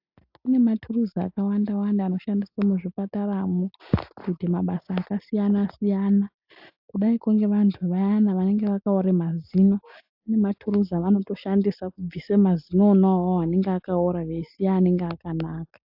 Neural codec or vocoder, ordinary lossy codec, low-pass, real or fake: none; AAC, 48 kbps; 5.4 kHz; real